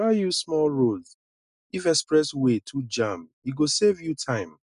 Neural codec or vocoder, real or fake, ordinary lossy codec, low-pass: none; real; none; 10.8 kHz